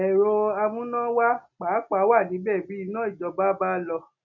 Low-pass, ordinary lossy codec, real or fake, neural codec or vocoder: 7.2 kHz; none; real; none